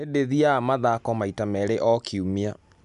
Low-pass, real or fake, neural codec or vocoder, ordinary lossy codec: 10.8 kHz; real; none; none